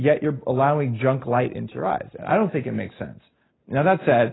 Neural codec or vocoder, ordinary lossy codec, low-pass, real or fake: none; AAC, 16 kbps; 7.2 kHz; real